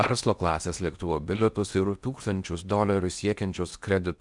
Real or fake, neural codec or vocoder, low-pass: fake; codec, 16 kHz in and 24 kHz out, 0.8 kbps, FocalCodec, streaming, 65536 codes; 10.8 kHz